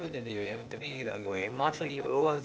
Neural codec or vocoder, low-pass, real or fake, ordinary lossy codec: codec, 16 kHz, 0.8 kbps, ZipCodec; none; fake; none